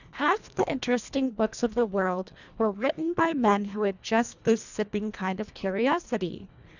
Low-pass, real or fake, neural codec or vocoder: 7.2 kHz; fake; codec, 24 kHz, 1.5 kbps, HILCodec